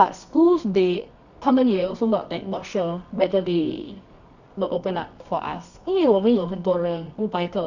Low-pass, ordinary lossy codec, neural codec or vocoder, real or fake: 7.2 kHz; none; codec, 24 kHz, 0.9 kbps, WavTokenizer, medium music audio release; fake